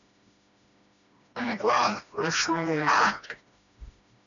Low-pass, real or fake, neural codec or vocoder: 7.2 kHz; fake; codec, 16 kHz, 1 kbps, FreqCodec, smaller model